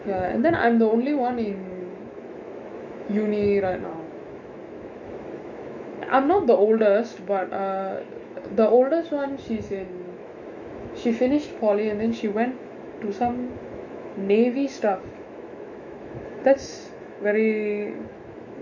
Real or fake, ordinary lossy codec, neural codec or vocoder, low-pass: fake; none; autoencoder, 48 kHz, 128 numbers a frame, DAC-VAE, trained on Japanese speech; 7.2 kHz